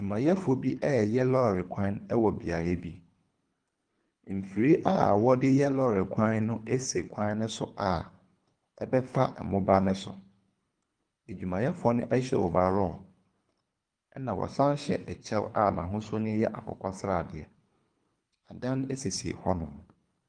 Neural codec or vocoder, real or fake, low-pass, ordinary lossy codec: codec, 24 kHz, 3 kbps, HILCodec; fake; 9.9 kHz; Opus, 32 kbps